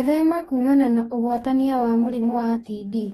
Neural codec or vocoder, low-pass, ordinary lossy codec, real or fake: codec, 44.1 kHz, 2.6 kbps, DAC; 19.8 kHz; AAC, 32 kbps; fake